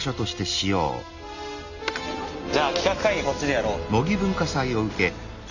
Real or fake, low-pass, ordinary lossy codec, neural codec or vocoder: real; 7.2 kHz; none; none